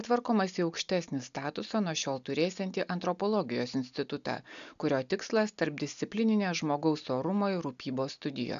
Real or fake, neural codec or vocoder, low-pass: real; none; 7.2 kHz